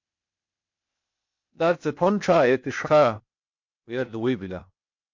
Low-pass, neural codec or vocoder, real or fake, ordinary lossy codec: 7.2 kHz; codec, 16 kHz, 0.8 kbps, ZipCodec; fake; MP3, 48 kbps